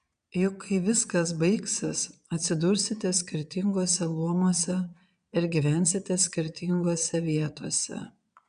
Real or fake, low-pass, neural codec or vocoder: fake; 9.9 kHz; vocoder, 22.05 kHz, 80 mel bands, Vocos